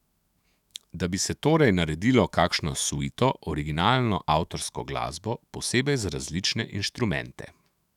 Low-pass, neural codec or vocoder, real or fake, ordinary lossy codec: 19.8 kHz; autoencoder, 48 kHz, 128 numbers a frame, DAC-VAE, trained on Japanese speech; fake; none